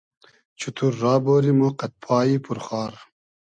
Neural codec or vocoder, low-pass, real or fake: none; 9.9 kHz; real